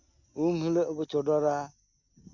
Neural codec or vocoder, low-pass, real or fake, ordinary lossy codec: none; 7.2 kHz; real; none